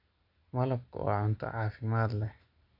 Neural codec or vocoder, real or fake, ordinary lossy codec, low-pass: none; real; none; 5.4 kHz